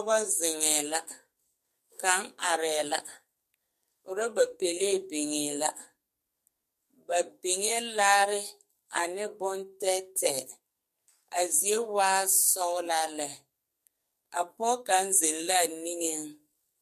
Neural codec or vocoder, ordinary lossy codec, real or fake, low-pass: codec, 44.1 kHz, 2.6 kbps, SNAC; MP3, 64 kbps; fake; 14.4 kHz